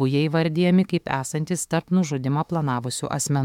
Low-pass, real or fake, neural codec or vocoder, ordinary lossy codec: 19.8 kHz; fake; autoencoder, 48 kHz, 32 numbers a frame, DAC-VAE, trained on Japanese speech; MP3, 96 kbps